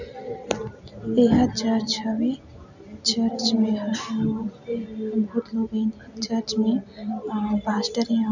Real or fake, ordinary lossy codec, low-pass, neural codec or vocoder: real; none; 7.2 kHz; none